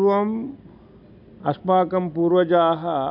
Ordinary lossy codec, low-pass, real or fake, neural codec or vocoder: none; 5.4 kHz; real; none